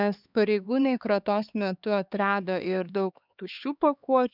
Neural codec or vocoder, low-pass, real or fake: codec, 16 kHz, 4 kbps, X-Codec, HuBERT features, trained on LibriSpeech; 5.4 kHz; fake